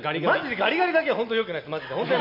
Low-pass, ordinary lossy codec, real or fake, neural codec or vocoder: 5.4 kHz; none; real; none